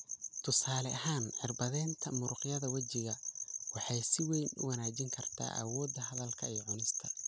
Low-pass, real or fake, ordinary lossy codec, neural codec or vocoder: none; real; none; none